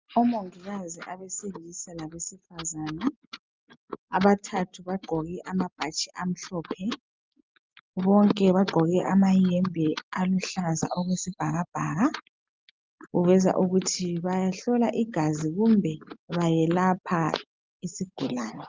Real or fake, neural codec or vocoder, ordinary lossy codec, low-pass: real; none; Opus, 32 kbps; 7.2 kHz